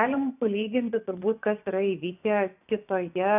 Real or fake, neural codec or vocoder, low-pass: fake; vocoder, 24 kHz, 100 mel bands, Vocos; 3.6 kHz